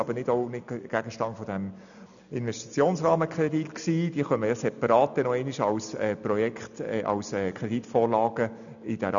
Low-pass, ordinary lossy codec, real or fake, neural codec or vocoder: 7.2 kHz; none; real; none